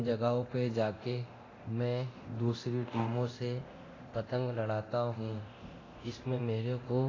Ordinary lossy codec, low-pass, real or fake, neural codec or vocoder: AAC, 32 kbps; 7.2 kHz; fake; codec, 24 kHz, 0.9 kbps, DualCodec